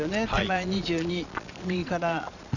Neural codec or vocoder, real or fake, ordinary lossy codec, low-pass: vocoder, 22.05 kHz, 80 mel bands, Vocos; fake; none; 7.2 kHz